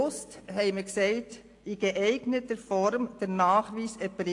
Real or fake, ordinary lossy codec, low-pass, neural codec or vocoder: real; AAC, 48 kbps; 10.8 kHz; none